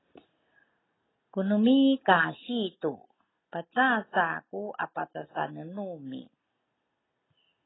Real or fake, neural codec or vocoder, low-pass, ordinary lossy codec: real; none; 7.2 kHz; AAC, 16 kbps